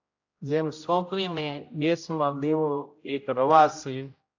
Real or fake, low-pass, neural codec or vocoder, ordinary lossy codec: fake; 7.2 kHz; codec, 16 kHz, 0.5 kbps, X-Codec, HuBERT features, trained on general audio; AAC, 48 kbps